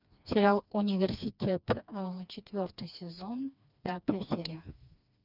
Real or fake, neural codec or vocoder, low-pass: fake; codec, 16 kHz, 2 kbps, FreqCodec, smaller model; 5.4 kHz